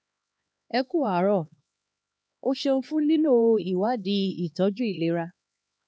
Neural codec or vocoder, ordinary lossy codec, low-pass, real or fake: codec, 16 kHz, 2 kbps, X-Codec, HuBERT features, trained on LibriSpeech; none; none; fake